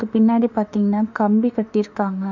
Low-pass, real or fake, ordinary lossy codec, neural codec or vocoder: 7.2 kHz; fake; none; autoencoder, 48 kHz, 32 numbers a frame, DAC-VAE, trained on Japanese speech